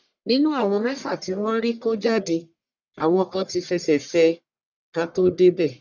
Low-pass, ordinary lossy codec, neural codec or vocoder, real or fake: 7.2 kHz; none; codec, 44.1 kHz, 1.7 kbps, Pupu-Codec; fake